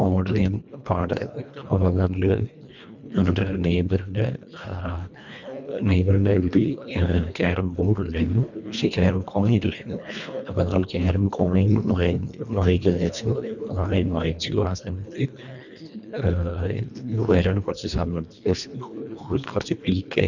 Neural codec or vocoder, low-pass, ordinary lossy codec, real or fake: codec, 24 kHz, 1.5 kbps, HILCodec; 7.2 kHz; none; fake